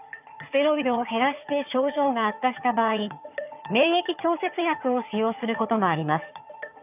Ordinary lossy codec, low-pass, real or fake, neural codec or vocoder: none; 3.6 kHz; fake; vocoder, 22.05 kHz, 80 mel bands, HiFi-GAN